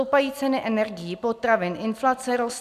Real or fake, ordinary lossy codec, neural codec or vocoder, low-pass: real; MP3, 96 kbps; none; 14.4 kHz